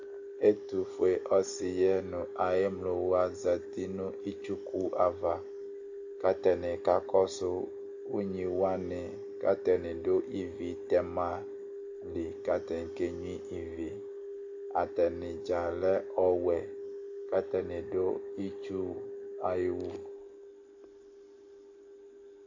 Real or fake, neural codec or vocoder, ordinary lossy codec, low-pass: real; none; AAC, 48 kbps; 7.2 kHz